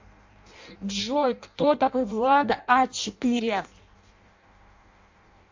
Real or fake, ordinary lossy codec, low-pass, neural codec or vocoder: fake; MP3, 48 kbps; 7.2 kHz; codec, 16 kHz in and 24 kHz out, 0.6 kbps, FireRedTTS-2 codec